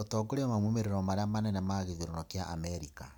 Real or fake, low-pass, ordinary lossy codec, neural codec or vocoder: real; none; none; none